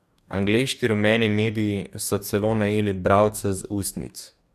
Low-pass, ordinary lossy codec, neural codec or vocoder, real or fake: 14.4 kHz; none; codec, 44.1 kHz, 2.6 kbps, DAC; fake